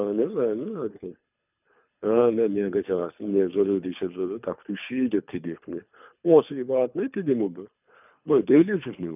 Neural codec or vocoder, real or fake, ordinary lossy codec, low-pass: codec, 24 kHz, 3 kbps, HILCodec; fake; none; 3.6 kHz